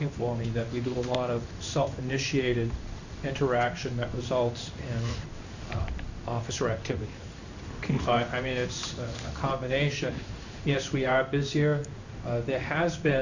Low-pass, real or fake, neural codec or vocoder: 7.2 kHz; fake; codec, 16 kHz in and 24 kHz out, 1 kbps, XY-Tokenizer